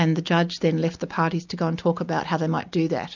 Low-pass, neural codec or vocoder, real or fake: 7.2 kHz; none; real